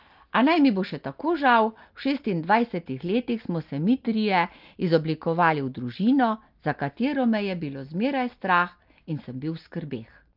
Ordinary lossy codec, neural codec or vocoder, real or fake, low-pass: Opus, 24 kbps; none; real; 5.4 kHz